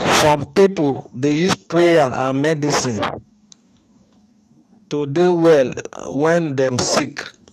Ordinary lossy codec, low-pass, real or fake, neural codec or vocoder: none; 14.4 kHz; fake; codec, 44.1 kHz, 2.6 kbps, DAC